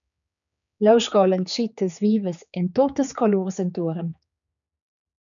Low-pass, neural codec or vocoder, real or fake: 7.2 kHz; codec, 16 kHz, 4 kbps, X-Codec, HuBERT features, trained on general audio; fake